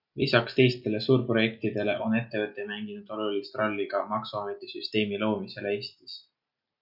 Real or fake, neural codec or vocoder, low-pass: real; none; 5.4 kHz